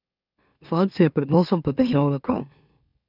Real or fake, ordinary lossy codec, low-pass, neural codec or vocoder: fake; none; 5.4 kHz; autoencoder, 44.1 kHz, a latent of 192 numbers a frame, MeloTTS